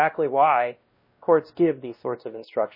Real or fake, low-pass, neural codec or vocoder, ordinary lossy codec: fake; 5.4 kHz; codec, 16 kHz, 1 kbps, X-Codec, WavLM features, trained on Multilingual LibriSpeech; MP3, 24 kbps